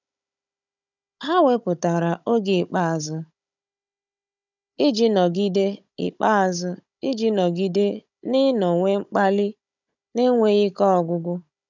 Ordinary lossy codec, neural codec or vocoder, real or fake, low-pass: none; codec, 16 kHz, 16 kbps, FunCodec, trained on Chinese and English, 50 frames a second; fake; 7.2 kHz